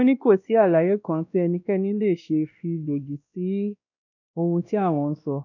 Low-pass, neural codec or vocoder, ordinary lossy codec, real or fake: 7.2 kHz; codec, 16 kHz, 1 kbps, X-Codec, WavLM features, trained on Multilingual LibriSpeech; none; fake